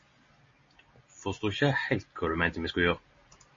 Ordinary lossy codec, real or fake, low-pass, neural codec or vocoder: MP3, 32 kbps; real; 7.2 kHz; none